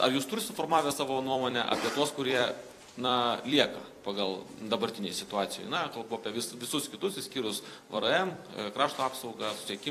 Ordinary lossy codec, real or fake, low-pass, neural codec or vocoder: AAC, 64 kbps; fake; 14.4 kHz; vocoder, 44.1 kHz, 128 mel bands every 256 samples, BigVGAN v2